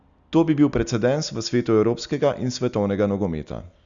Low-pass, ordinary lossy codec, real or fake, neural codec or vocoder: 7.2 kHz; Opus, 64 kbps; real; none